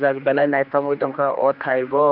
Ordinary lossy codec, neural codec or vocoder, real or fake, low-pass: none; codec, 16 kHz, 2 kbps, X-Codec, HuBERT features, trained on general audio; fake; 5.4 kHz